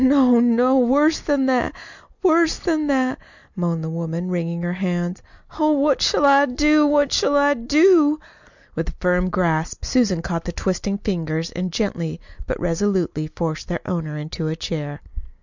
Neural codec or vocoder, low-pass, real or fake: none; 7.2 kHz; real